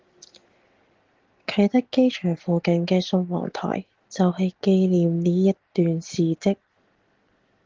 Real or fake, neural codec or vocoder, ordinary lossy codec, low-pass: real; none; Opus, 16 kbps; 7.2 kHz